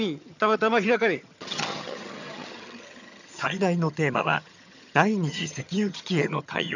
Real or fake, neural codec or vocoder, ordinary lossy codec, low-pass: fake; vocoder, 22.05 kHz, 80 mel bands, HiFi-GAN; none; 7.2 kHz